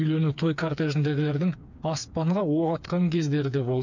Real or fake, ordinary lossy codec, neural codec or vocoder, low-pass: fake; none; codec, 16 kHz, 4 kbps, FreqCodec, smaller model; 7.2 kHz